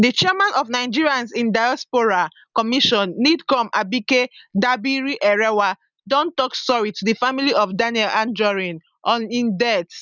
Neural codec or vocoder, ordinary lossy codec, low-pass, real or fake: none; none; 7.2 kHz; real